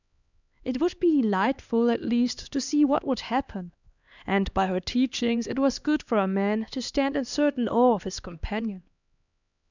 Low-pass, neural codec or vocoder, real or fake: 7.2 kHz; codec, 16 kHz, 2 kbps, X-Codec, HuBERT features, trained on LibriSpeech; fake